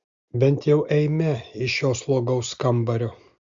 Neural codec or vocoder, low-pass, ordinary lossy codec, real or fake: none; 7.2 kHz; Opus, 64 kbps; real